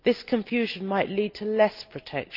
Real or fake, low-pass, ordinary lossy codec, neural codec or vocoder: real; 5.4 kHz; Opus, 24 kbps; none